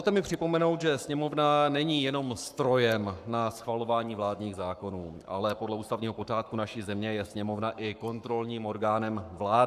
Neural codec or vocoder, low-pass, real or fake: codec, 44.1 kHz, 7.8 kbps, Pupu-Codec; 14.4 kHz; fake